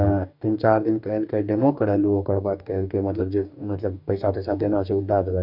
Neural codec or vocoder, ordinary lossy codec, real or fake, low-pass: codec, 44.1 kHz, 2.6 kbps, DAC; none; fake; 5.4 kHz